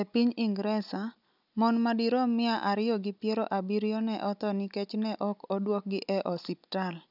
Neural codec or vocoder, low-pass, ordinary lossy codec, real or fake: none; 5.4 kHz; none; real